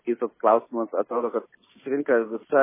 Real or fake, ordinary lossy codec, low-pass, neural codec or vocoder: real; MP3, 16 kbps; 3.6 kHz; none